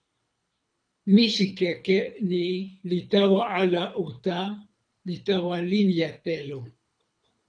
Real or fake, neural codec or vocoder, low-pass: fake; codec, 24 kHz, 3 kbps, HILCodec; 9.9 kHz